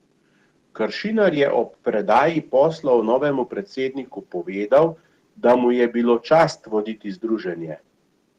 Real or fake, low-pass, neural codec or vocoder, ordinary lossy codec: real; 14.4 kHz; none; Opus, 16 kbps